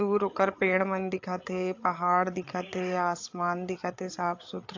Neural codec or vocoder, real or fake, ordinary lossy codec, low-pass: codec, 16 kHz, 8 kbps, FreqCodec, larger model; fake; AAC, 48 kbps; 7.2 kHz